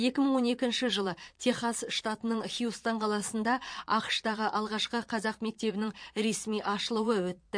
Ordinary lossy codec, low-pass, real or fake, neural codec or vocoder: MP3, 48 kbps; 9.9 kHz; fake; vocoder, 22.05 kHz, 80 mel bands, Vocos